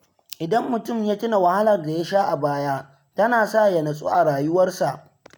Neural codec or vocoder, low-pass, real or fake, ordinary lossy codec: none; none; real; none